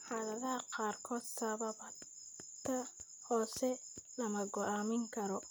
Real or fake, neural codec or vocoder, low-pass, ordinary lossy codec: real; none; none; none